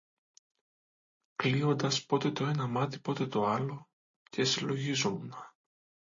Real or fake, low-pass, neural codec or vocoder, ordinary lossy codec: real; 7.2 kHz; none; MP3, 32 kbps